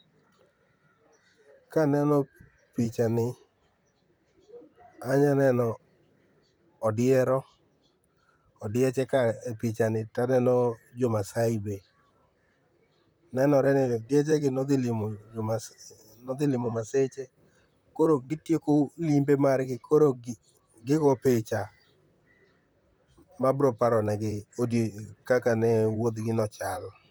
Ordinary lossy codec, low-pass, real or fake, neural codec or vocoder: none; none; fake; vocoder, 44.1 kHz, 128 mel bands, Pupu-Vocoder